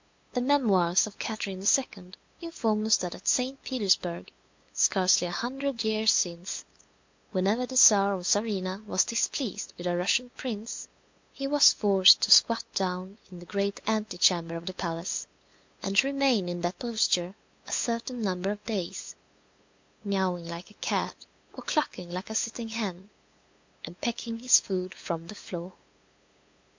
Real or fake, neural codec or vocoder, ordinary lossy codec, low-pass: fake; codec, 16 kHz, 8 kbps, FunCodec, trained on LibriTTS, 25 frames a second; MP3, 48 kbps; 7.2 kHz